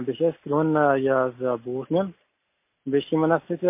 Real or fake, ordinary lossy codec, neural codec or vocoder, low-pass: real; MP3, 24 kbps; none; 3.6 kHz